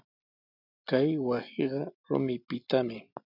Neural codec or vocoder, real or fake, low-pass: none; real; 5.4 kHz